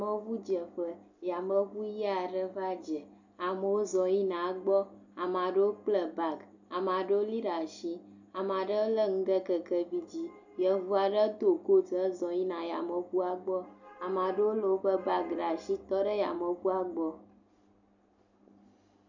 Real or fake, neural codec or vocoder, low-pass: real; none; 7.2 kHz